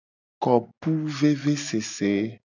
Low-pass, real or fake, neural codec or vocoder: 7.2 kHz; real; none